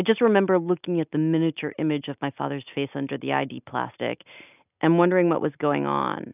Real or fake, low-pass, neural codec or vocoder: real; 3.6 kHz; none